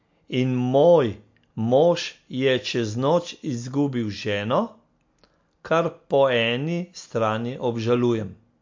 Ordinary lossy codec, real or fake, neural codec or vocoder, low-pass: MP3, 48 kbps; real; none; 7.2 kHz